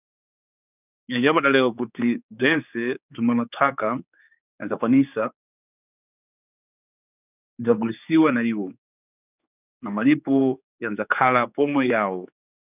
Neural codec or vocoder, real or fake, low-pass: codec, 16 kHz, 4 kbps, X-Codec, HuBERT features, trained on general audio; fake; 3.6 kHz